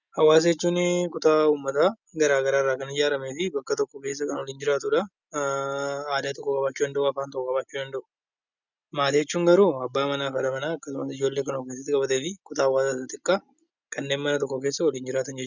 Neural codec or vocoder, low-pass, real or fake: none; 7.2 kHz; real